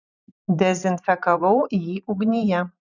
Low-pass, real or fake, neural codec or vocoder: 7.2 kHz; fake; vocoder, 44.1 kHz, 128 mel bands every 512 samples, BigVGAN v2